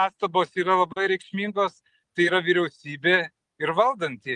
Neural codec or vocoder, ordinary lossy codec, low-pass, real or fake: none; Opus, 32 kbps; 10.8 kHz; real